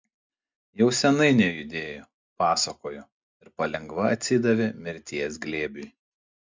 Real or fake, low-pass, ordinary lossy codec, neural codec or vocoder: real; 7.2 kHz; MP3, 64 kbps; none